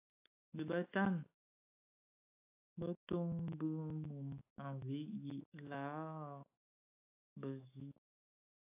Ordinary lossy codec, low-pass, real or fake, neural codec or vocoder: AAC, 32 kbps; 3.6 kHz; fake; autoencoder, 48 kHz, 128 numbers a frame, DAC-VAE, trained on Japanese speech